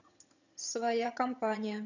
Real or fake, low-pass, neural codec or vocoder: fake; 7.2 kHz; vocoder, 22.05 kHz, 80 mel bands, HiFi-GAN